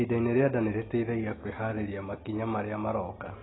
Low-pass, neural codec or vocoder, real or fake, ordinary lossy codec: 7.2 kHz; none; real; AAC, 16 kbps